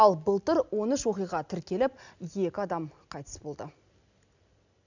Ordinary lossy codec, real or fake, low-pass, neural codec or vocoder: none; real; 7.2 kHz; none